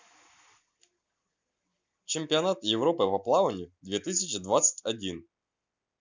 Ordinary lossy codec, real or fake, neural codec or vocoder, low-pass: none; real; none; none